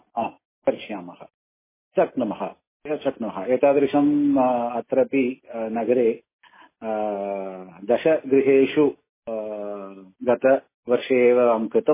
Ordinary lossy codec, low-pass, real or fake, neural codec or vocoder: MP3, 16 kbps; 3.6 kHz; real; none